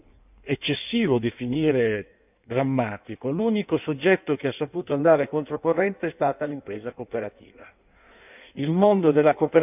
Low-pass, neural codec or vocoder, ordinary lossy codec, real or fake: 3.6 kHz; codec, 16 kHz in and 24 kHz out, 1.1 kbps, FireRedTTS-2 codec; none; fake